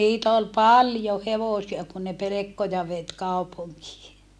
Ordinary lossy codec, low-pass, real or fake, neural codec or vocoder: none; none; real; none